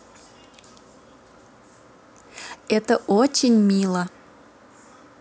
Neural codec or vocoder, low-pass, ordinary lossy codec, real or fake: none; none; none; real